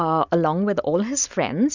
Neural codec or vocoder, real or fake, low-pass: none; real; 7.2 kHz